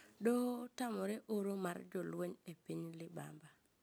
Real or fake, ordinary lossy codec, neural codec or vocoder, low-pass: real; none; none; none